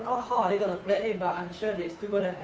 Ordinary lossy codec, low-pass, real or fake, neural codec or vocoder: none; none; fake; codec, 16 kHz, 2 kbps, FunCodec, trained on Chinese and English, 25 frames a second